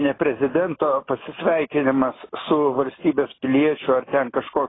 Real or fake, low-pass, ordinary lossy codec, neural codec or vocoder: real; 7.2 kHz; AAC, 16 kbps; none